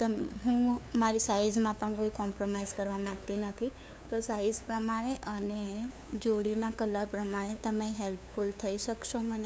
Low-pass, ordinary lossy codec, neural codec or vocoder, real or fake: none; none; codec, 16 kHz, 2 kbps, FunCodec, trained on LibriTTS, 25 frames a second; fake